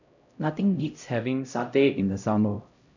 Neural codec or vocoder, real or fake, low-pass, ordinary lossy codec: codec, 16 kHz, 0.5 kbps, X-Codec, HuBERT features, trained on LibriSpeech; fake; 7.2 kHz; none